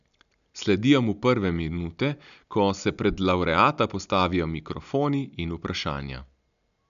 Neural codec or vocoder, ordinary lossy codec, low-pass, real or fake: none; none; 7.2 kHz; real